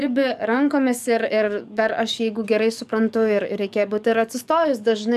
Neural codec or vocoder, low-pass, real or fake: codec, 44.1 kHz, 7.8 kbps, DAC; 14.4 kHz; fake